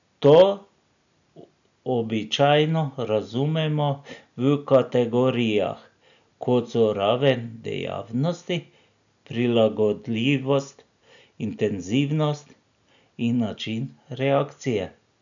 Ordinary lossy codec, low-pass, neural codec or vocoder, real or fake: none; 7.2 kHz; none; real